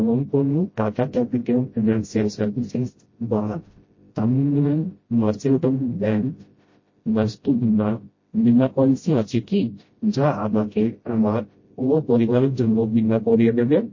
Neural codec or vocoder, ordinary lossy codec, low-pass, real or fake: codec, 16 kHz, 0.5 kbps, FreqCodec, smaller model; MP3, 32 kbps; 7.2 kHz; fake